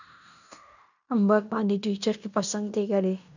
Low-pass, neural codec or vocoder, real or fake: 7.2 kHz; codec, 16 kHz in and 24 kHz out, 0.9 kbps, LongCat-Audio-Codec, fine tuned four codebook decoder; fake